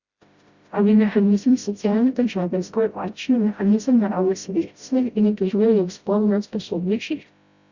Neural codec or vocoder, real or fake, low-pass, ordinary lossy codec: codec, 16 kHz, 0.5 kbps, FreqCodec, smaller model; fake; 7.2 kHz; Opus, 64 kbps